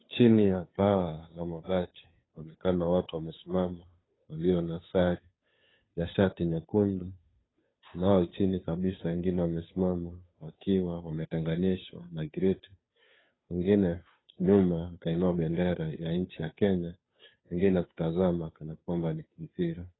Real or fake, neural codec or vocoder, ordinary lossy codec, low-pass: fake; codec, 16 kHz, 2 kbps, FunCodec, trained on Chinese and English, 25 frames a second; AAC, 16 kbps; 7.2 kHz